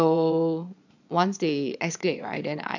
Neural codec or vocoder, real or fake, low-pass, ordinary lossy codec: vocoder, 22.05 kHz, 80 mel bands, Vocos; fake; 7.2 kHz; none